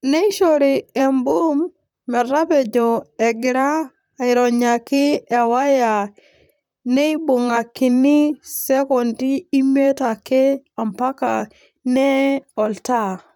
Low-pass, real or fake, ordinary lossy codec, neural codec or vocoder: 19.8 kHz; fake; none; vocoder, 44.1 kHz, 128 mel bands, Pupu-Vocoder